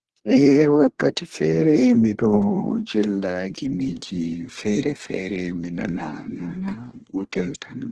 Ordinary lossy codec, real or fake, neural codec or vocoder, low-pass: Opus, 16 kbps; fake; codec, 24 kHz, 1 kbps, SNAC; 10.8 kHz